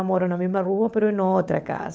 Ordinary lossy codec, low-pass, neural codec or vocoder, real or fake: none; none; codec, 16 kHz, 16 kbps, FunCodec, trained on LibriTTS, 50 frames a second; fake